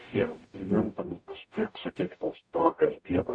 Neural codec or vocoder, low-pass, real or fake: codec, 44.1 kHz, 0.9 kbps, DAC; 9.9 kHz; fake